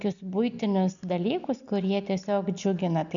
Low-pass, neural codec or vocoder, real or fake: 7.2 kHz; none; real